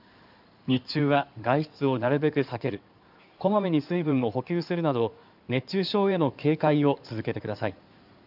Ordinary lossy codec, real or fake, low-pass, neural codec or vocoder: none; fake; 5.4 kHz; codec, 16 kHz in and 24 kHz out, 2.2 kbps, FireRedTTS-2 codec